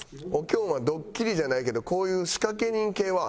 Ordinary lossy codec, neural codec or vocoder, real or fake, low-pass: none; none; real; none